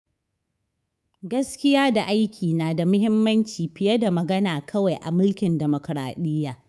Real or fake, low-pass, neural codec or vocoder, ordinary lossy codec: fake; none; codec, 24 kHz, 3.1 kbps, DualCodec; none